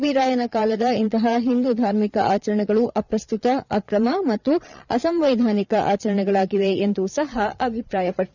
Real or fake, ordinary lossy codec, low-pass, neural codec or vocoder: fake; none; 7.2 kHz; vocoder, 22.05 kHz, 80 mel bands, Vocos